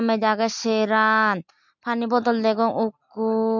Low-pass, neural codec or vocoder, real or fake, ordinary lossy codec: 7.2 kHz; none; real; MP3, 48 kbps